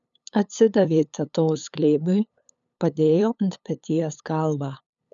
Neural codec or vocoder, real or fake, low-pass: codec, 16 kHz, 8 kbps, FunCodec, trained on LibriTTS, 25 frames a second; fake; 7.2 kHz